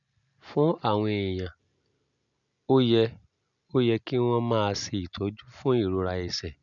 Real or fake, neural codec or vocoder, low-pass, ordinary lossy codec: real; none; 7.2 kHz; none